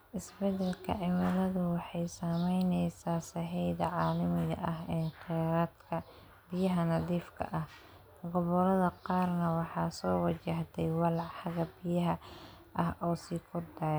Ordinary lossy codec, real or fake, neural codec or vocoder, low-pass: none; real; none; none